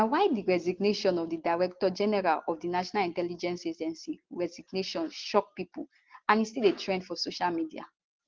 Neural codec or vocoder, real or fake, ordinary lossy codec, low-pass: none; real; Opus, 16 kbps; 7.2 kHz